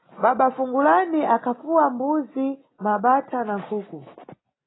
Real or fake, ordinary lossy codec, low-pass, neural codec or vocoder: real; AAC, 16 kbps; 7.2 kHz; none